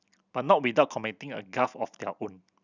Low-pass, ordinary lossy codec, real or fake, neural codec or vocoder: 7.2 kHz; none; real; none